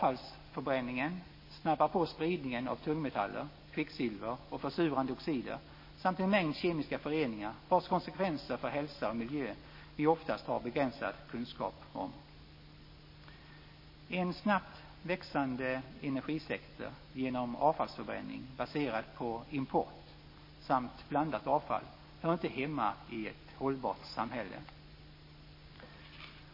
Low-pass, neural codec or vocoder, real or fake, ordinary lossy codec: 5.4 kHz; none; real; MP3, 24 kbps